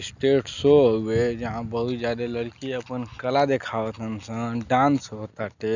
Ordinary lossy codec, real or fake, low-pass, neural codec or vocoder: none; real; 7.2 kHz; none